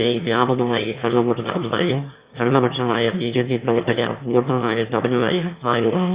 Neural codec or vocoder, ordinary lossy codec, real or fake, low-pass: autoencoder, 22.05 kHz, a latent of 192 numbers a frame, VITS, trained on one speaker; Opus, 64 kbps; fake; 3.6 kHz